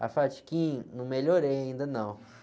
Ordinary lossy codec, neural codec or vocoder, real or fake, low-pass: none; none; real; none